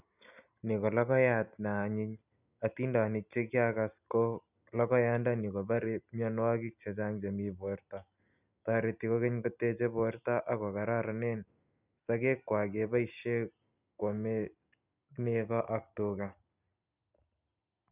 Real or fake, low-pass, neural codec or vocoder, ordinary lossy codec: real; 3.6 kHz; none; none